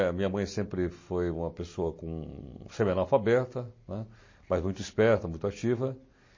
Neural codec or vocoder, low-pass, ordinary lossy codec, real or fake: none; 7.2 kHz; MP3, 32 kbps; real